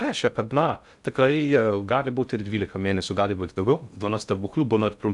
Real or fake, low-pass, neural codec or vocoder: fake; 10.8 kHz; codec, 16 kHz in and 24 kHz out, 0.6 kbps, FocalCodec, streaming, 2048 codes